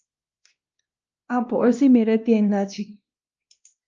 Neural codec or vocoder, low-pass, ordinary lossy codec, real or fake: codec, 16 kHz, 1 kbps, X-Codec, WavLM features, trained on Multilingual LibriSpeech; 7.2 kHz; Opus, 24 kbps; fake